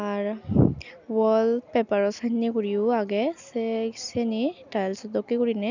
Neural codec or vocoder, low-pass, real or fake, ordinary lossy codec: none; 7.2 kHz; real; none